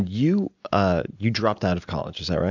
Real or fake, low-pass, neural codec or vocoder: real; 7.2 kHz; none